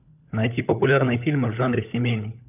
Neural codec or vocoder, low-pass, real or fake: codec, 16 kHz, 16 kbps, FunCodec, trained on LibriTTS, 50 frames a second; 3.6 kHz; fake